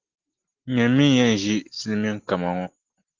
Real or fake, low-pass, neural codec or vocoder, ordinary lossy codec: real; 7.2 kHz; none; Opus, 16 kbps